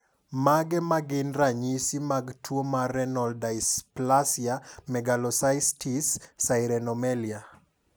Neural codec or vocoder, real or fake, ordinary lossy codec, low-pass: none; real; none; none